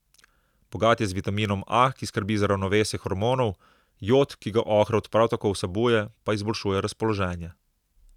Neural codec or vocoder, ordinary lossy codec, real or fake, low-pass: none; none; real; 19.8 kHz